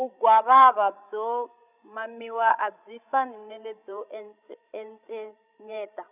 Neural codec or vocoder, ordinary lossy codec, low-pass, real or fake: codec, 16 kHz, 16 kbps, FreqCodec, larger model; none; 3.6 kHz; fake